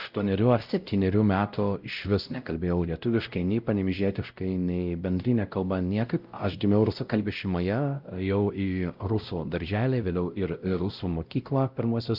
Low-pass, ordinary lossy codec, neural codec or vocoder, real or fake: 5.4 kHz; Opus, 24 kbps; codec, 16 kHz, 0.5 kbps, X-Codec, WavLM features, trained on Multilingual LibriSpeech; fake